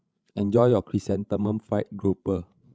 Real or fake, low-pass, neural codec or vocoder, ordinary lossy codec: fake; none; codec, 16 kHz, 16 kbps, FreqCodec, larger model; none